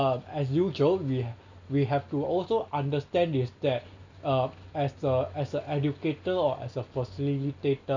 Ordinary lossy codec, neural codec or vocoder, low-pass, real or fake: none; none; 7.2 kHz; real